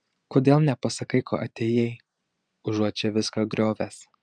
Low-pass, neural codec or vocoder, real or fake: 9.9 kHz; vocoder, 24 kHz, 100 mel bands, Vocos; fake